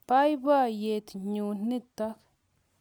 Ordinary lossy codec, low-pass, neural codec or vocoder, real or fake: none; none; none; real